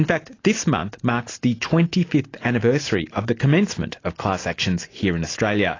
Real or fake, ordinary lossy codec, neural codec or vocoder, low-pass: real; AAC, 32 kbps; none; 7.2 kHz